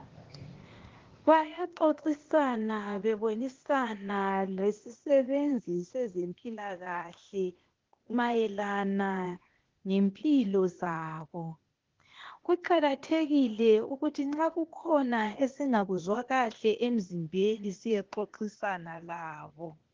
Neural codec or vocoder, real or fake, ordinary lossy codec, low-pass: codec, 16 kHz, 0.8 kbps, ZipCodec; fake; Opus, 16 kbps; 7.2 kHz